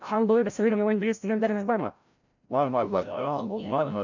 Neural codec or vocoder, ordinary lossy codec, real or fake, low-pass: codec, 16 kHz, 0.5 kbps, FreqCodec, larger model; none; fake; 7.2 kHz